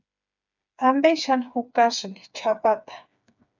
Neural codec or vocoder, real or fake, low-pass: codec, 16 kHz, 4 kbps, FreqCodec, smaller model; fake; 7.2 kHz